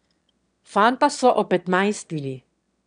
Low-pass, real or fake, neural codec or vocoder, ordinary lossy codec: 9.9 kHz; fake; autoencoder, 22.05 kHz, a latent of 192 numbers a frame, VITS, trained on one speaker; none